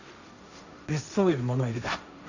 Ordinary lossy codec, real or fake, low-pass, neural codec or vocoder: none; fake; 7.2 kHz; codec, 16 kHz, 1.1 kbps, Voila-Tokenizer